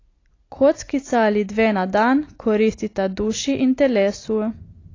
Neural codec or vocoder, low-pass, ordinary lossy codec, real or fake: none; 7.2 kHz; AAC, 32 kbps; real